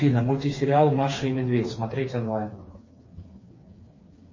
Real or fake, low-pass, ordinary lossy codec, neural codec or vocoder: fake; 7.2 kHz; MP3, 32 kbps; codec, 16 kHz, 4 kbps, FreqCodec, smaller model